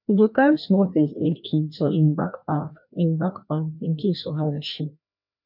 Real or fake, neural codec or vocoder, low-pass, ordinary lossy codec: fake; codec, 16 kHz, 1 kbps, FreqCodec, larger model; 5.4 kHz; none